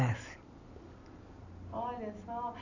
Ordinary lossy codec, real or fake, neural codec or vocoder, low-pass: none; real; none; 7.2 kHz